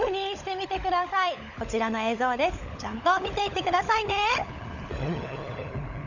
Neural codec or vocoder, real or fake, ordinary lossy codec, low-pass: codec, 16 kHz, 16 kbps, FunCodec, trained on LibriTTS, 50 frames a second; fake; none; 7.2 kHz